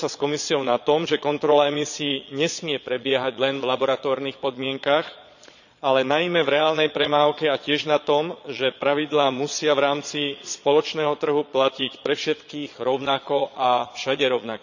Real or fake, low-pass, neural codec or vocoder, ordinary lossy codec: fake; 7.2 kHz; vocoder, 22.05 kHz, 80 mel bands, Vocos; none